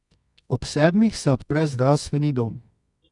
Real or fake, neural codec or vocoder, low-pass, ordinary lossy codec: fake; codec, 24 kHz, 0.9 kbps, WavTokenizer, medium music audio release; 10.8 kHz; none